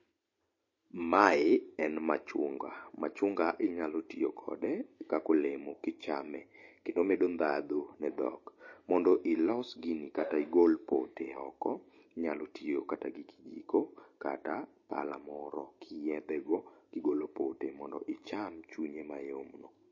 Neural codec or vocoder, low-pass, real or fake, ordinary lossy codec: none; 7.2 kHz; real; MP3, 32 kbps